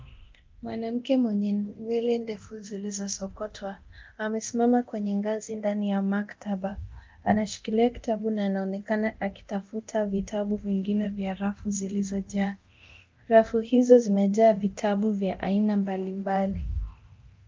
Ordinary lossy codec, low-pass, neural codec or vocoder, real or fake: Opus, 32 kbps; 7.2 kHz; codec, 24 kHz, 0.9 kbps, DualCodec; fake